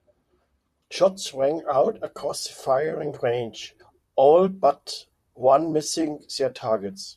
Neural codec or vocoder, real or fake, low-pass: vocoder, 44.1 kHz, 128 mel bands, Pupu-Vocoder; fake; 14.4 kHz